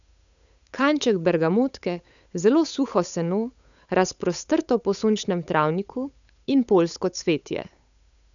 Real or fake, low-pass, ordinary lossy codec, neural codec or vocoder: fake; 7.2 kHz; none; codec, 16 kHz, 8 kbps, FunCodec, trained on Chinese and English, 25 frames a second